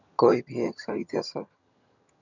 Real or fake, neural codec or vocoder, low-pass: fake; vocoder, 22.05 kHz, 80 mel bands, HiFi-GAN; 7.2 kHz